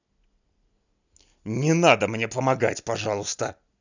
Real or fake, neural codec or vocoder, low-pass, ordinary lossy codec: real; none; 7.2 kHz; none